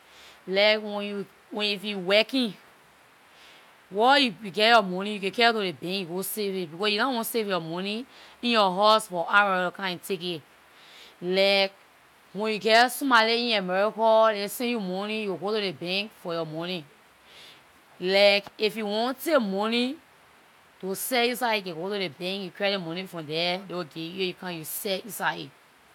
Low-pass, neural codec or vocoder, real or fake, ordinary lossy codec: 19.8 kHz; none; real; none